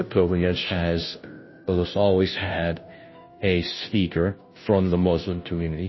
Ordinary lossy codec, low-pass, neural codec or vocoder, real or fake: MP3, 24 kbps; 7.2 kHz; codec, 16 kHz, 0.5 kbps, FunCodec, trained on Chinese and English, 25 frames a second; fake